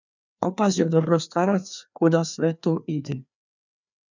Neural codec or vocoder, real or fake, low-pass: codec, 16 kHz, 2 kbps, FreqCodec, larger model; fake; 7.2 kHz